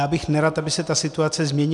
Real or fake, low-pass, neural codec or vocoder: real; 10.8 kHz; none